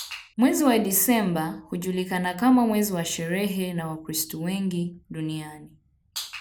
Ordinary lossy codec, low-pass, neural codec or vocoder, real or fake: none; none; none; real